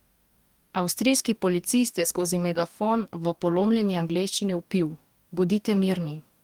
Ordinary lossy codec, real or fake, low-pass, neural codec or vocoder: Opus, 32 kbps; fake; 19.8 kHz; codec, 44.1 kHz, 2.6 kbps, DAC